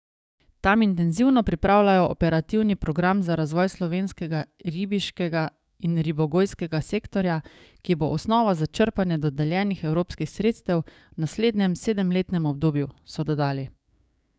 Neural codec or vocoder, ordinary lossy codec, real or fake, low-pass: codec, 16 kHz, 6 kbps, DAC; none; fake; none